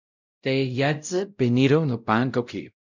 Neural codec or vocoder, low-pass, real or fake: codec, 16 kHz, 0.5 kbps, X-Codec, WavLM features, trained on Multilingual LibriSpeech; 7.2 kHz; fake